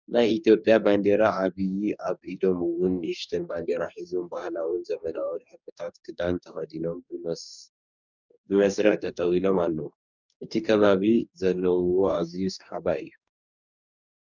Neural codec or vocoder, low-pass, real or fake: codec, 44.1 kHz, 2.6 kbps, DAC; 7.2 kHz; fake